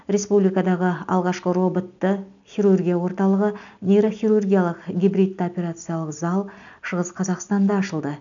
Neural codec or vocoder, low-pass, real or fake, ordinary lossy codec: none; 7.2 kHz; real; none